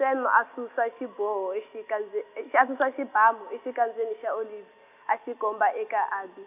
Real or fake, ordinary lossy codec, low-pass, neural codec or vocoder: real; none; 3.6 kHz; none